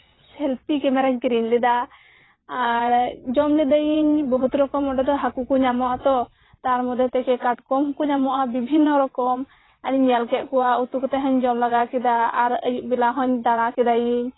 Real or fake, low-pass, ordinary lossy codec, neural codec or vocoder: fake; 7.2 kHz; AAC, 16 kbps; vocoder, 22.05 kHz, 80 mel bands, WaveNeXt